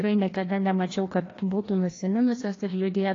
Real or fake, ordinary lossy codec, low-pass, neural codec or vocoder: fake; AAC, 32 kbps; 7.2 kHz; codec, 16 kHz, 1 kbps, FreqCodec, larger model